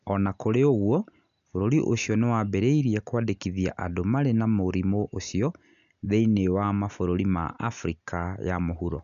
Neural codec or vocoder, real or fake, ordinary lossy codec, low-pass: none; real; none; 7.2 kHz